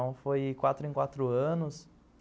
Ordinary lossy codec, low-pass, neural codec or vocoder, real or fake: none; none; none; real